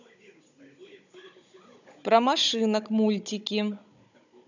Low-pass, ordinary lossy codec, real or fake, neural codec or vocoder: 7.2 kHz; none; fake; codec, 16 kHz, 16 kbps, FunCodec, trained on Chinese and English, 50 frames a second